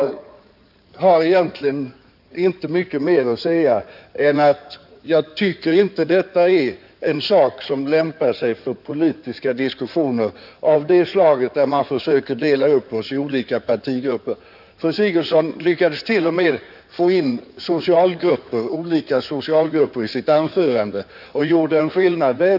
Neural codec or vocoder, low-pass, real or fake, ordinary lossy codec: codec, 16 kHz in and 24 kHz out, 2.2 kbps, FireRedTTS-2 codec; 5.4 kHz; fake; none